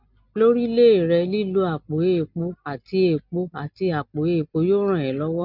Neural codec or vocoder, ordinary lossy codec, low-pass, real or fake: none; none; 5.4 kHz; real